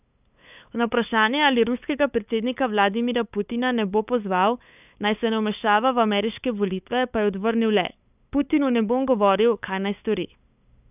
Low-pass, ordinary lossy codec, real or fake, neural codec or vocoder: 3.6 kHz; none; fake; codec, 16 kHz, 8 kbps, FunCodec, trained on LibriTTS, 25 frames a second